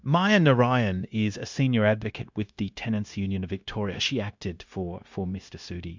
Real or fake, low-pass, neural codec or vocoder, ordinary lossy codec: fake; 7.2 kHz; codec, 16 kHz, 0.9 kbps, LongCat-Audio-Codec; MP3, 64 kbps